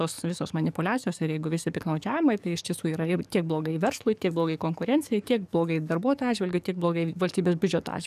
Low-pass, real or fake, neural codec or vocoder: 14.4 kHz; fake; codec, 44.1 kHz, 7.8 kbps, DAC